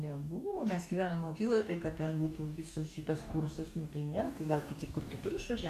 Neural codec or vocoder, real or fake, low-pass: codec, 44.1 kHz, 2.6 kbps, DAC; fake; 14.4 kHz